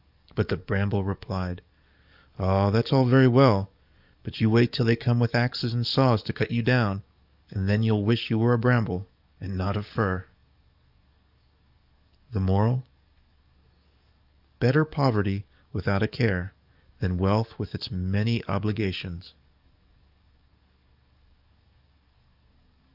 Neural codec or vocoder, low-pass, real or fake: codec, 44.1 kHz, 7.8 kbps, DAC; 5.4 kHz; fake